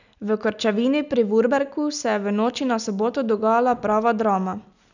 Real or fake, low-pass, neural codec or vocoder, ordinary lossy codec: real; 7.2 kHz; none; none